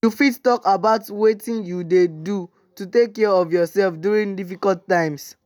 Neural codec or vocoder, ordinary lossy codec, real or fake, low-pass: none; none; real; none